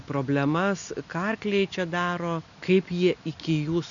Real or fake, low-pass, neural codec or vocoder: real; 7.2 kHz; none